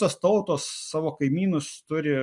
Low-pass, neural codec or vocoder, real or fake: 10.8 kHz; none; real